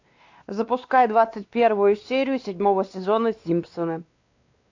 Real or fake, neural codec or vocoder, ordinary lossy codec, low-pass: fake; codec, 16 kHz, 2 kbps, X-Codec, WavLM features, trained on Multilingual LibriSpeech; AAC, 48 kbps; 7.2 kHz